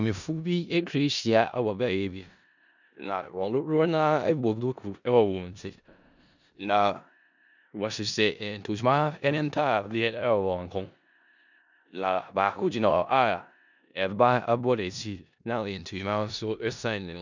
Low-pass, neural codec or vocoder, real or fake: 7.2 kHz; codec, 16 kHz in and 24 kHz out, 0.4 kbps, LongCat-Audio-Codec, four codebook decoder; fake